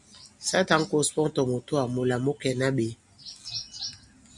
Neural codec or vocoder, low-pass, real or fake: none; 10.8 kHz; real